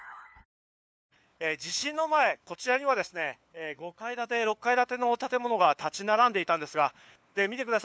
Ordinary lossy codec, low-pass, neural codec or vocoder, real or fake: none; none; codec, 16 kHz, 4 kbps, FunCodec, trained on LibriTTS, 50 frames a second; fake